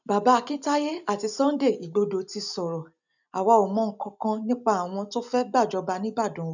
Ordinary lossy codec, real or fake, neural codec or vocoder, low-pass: none; real; none; 7.2 kHz